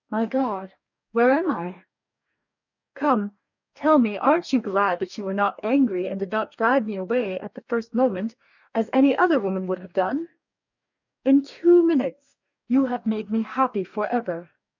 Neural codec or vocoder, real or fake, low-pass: codec, 44.1 kHz, 2.6 kbps, DAC; fake; 7.2 kHz